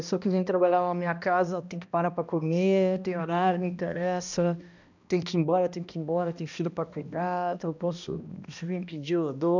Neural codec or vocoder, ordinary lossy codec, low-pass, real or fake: codec, 16 kHz, 1 kbps, X-Codec, HuBERT features, trained on balanced general audio; none; 7.2 kHz; fake